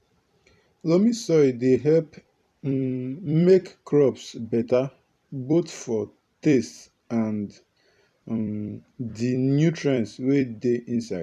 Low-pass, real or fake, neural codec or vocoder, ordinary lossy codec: 14.4 kHz; fake; vocoder, 44.1 kHz, 128 mel bands every 256 samples, BigVGAN v2; MP3, 96 kbps